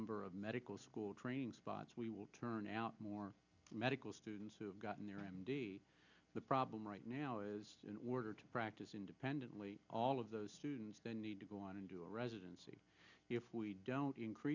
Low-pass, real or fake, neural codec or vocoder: 7.2 kHz; real; none